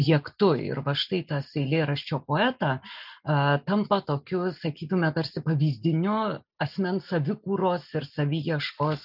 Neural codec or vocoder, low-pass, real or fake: none; 5.4 kHz; real